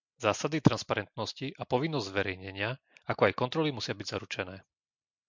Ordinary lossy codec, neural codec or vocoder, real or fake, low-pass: MP3, 64 kbps; none; real; 7.2 kHz